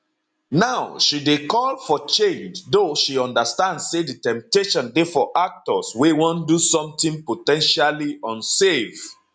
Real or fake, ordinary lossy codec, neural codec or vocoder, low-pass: real; none; none; 9.9 kHz